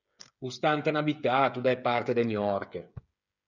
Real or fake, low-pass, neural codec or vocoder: fake; 7.2 kHz; codec, 16 kHz, 8 kbps, FreqCodec, smaller model